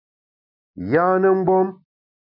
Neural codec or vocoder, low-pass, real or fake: none; 5.4 kHz; real